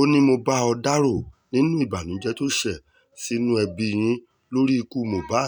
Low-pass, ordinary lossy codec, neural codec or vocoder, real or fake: 19.8 kHz; none; none; real